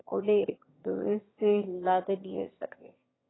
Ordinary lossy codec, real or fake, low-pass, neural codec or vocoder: AAC, 16 kbps; fake; 7.2 kHz; autoencoder, 22.05 kHz, a latent of 192 numbers a frame, VITS, trained on one speaker